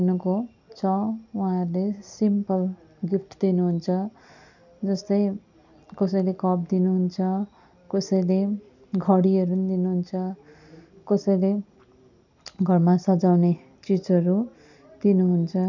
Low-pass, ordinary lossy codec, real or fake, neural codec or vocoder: 7.2 kHz; none; real; none